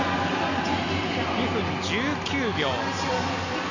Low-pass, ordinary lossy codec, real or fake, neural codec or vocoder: 7.2 kHz; none; real; none